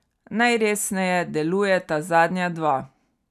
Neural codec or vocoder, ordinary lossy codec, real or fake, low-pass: none; none; real; 14.4 kHz